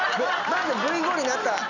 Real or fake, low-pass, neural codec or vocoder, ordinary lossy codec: real; 7.2 kHz; none; none